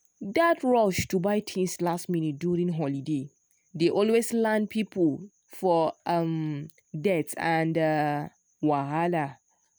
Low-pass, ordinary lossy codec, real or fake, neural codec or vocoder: none; none; real; none